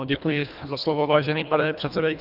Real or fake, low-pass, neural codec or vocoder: fake; 5.4 kHz; codec, 24 kHz, 1.5 kbps, HILCodec